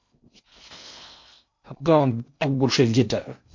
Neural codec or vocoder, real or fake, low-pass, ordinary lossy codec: codec, 16 kHz in and 24 kHz out, 0.6 kbps, FocalCodec, streaming, 2048 codes; fake; 7.2 kHz; MP3, 48 kbps